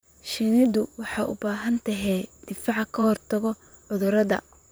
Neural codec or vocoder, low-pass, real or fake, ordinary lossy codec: vocoder, 44.1 kHz, 128 mel bands, Pupu-Vocoder; none; fake; none